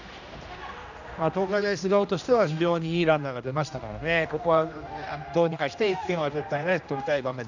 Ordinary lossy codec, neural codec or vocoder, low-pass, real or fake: none; codec, 16 kHz, 1 kbps, X-Codec, HuBERT features, trained on general audio; 7.2 kHz; fake